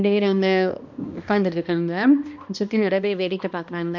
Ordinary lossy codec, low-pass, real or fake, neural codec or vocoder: none; 7.2 kHz; fake; codec, 16 kHz, 1 kbps, X-Codec, HuBERT features, trained on balanced general audio